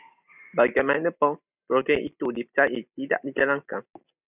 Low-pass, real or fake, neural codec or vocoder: 3.6 kHz; real; none